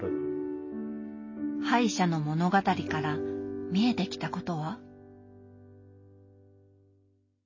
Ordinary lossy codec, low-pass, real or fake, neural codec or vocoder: MP3, 32 kbps; 7.2 kHz; real; none